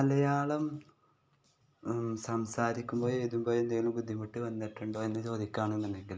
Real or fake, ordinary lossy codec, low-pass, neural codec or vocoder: real; none; none; none